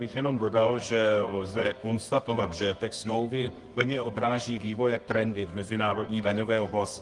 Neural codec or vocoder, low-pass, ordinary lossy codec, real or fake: codec, 24 kHz, 0.9 kbps, WavTokenizer, medium music audio release; 10.8 kHz; Opus, 24 kbps; fake